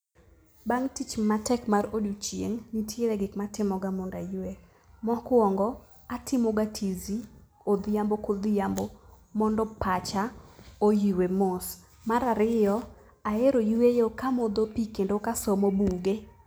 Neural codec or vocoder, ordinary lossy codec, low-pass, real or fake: none; none; none; real